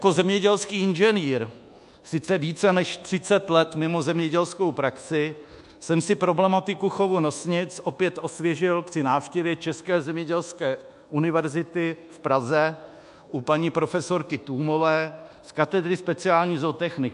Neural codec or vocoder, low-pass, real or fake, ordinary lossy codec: codec, 24 kHz, 1.2 kbps, DualCodec; 10.8 kHz; fake; MP3, 64 kbps